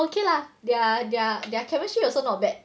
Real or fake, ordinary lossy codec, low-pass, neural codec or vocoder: real; none; none; none